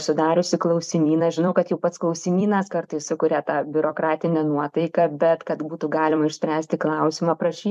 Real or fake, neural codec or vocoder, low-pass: fake; vocoder, 44.1 kHz, 128 mel bands every 256 samples, BigVGAN v2; 14.4 kHz